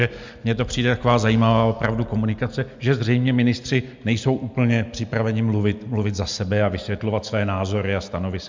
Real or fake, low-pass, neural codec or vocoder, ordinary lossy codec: real; 7.2 kHz; none; MP3, 64 kbps